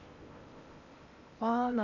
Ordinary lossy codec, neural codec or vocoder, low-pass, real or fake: none; codec, 16 kHz in and 24 kHz out, 0.8 kbps, FocalCodec, streaming, 65536 codes; 7.2 kHz; fake